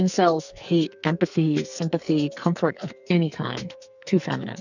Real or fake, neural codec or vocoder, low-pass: fake; codec, 44.1 kHz, 2.6 kbps, SNAC; 7.2 kHz